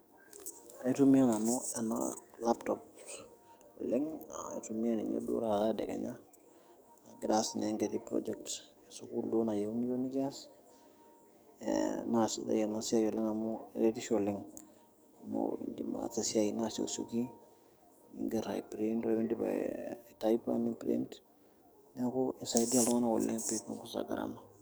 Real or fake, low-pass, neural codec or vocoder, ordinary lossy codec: fake; none; codec, 44.1 kHz, 7.8 kbps, DAC; none